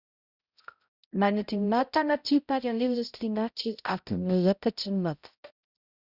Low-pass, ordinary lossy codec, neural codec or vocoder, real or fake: 5.4 kHz; Opus, 64 kbps; codec, 16 kHz, 0.5 kbps, X-Codec, HuBERT features, trained on balanced general audio; fake